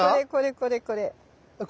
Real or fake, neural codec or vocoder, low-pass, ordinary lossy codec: real; none; none; none